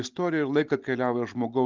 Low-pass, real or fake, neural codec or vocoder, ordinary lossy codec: 7.2 kHz; real; none; Opus, 32 kbps